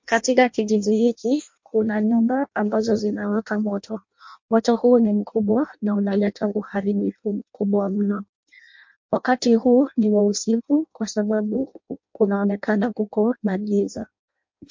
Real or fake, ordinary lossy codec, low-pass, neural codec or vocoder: fake; MP3, 48 kbps; 7.2 kHz; codec, 16 kHz in and 24 kHz out, 0.6 kbps, FireRedTTS-2 codec